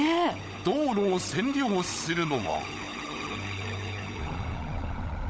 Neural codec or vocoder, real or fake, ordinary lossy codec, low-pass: codec, 16 kHz, 16 kbps, FunCodec, trained on LibriTTS, 50 frames a second; fake; none; none